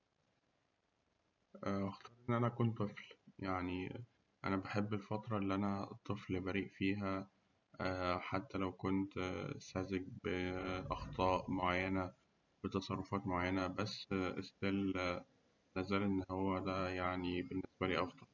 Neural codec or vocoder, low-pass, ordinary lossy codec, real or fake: vocoder, 44.1 kHz, 128 mel bands every 512 samples, BigVGAN v2; 7.2 kHz; none; fake